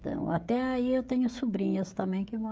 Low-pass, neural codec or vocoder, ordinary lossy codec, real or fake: none; codec, 16 kHz, 16 kbps, FreqCodec, smaller model; none; fake